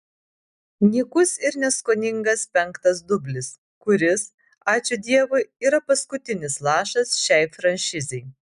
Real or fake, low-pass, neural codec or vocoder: real; 10.8 kHz; none